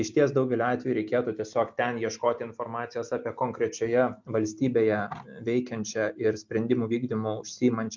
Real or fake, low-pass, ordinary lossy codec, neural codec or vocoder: real; 7.2 kHz; MP3, 64 kbps; none